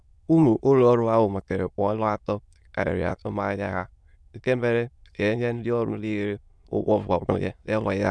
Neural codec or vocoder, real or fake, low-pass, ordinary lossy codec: autoencoder, 22.05 kHz, a latent of 192 numbers a frame, VITS, trained on many speakers; fake; none; none